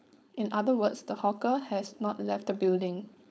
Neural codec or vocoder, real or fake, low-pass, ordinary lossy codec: codec, 16 kHz, 4.8 kbps, FACodec; fake; none; none